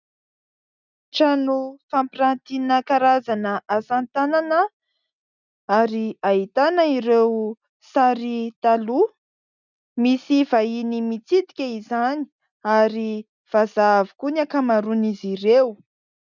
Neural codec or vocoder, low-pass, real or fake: none; 7.2 kHz; real